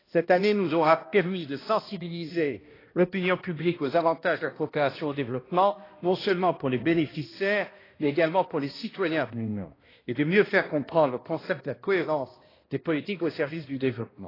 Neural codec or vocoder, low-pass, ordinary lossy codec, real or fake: codec, 16 kHz, 1 kbps, X-Codec, HuBERT features, trained on balanced general audio; 5.4 kHz; AAC, 24 kbps; fake